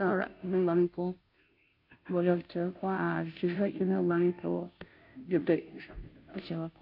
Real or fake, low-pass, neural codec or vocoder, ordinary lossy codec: fake; 5.4 kHz; codec, 16 kHz, 0.5 kbps, FunCodec, trained on Chinese and English, 25 frames a second; AAC, 48 kbps